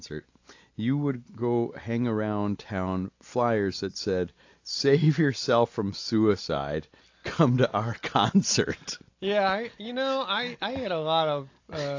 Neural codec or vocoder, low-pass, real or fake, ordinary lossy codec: none; 7.2 kHz; real; AAC, 48 kbps